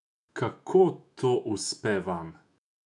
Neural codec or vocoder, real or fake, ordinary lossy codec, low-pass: autoencoder, 48 kHz, 128 numbers a frame, DAC-VAE, trained on Japanese speech; fake; none; 10.8 kHz